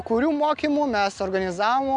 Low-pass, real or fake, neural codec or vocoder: 9.9 kHz; real; none